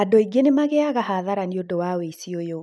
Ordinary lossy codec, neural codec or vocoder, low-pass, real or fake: none; none; none; real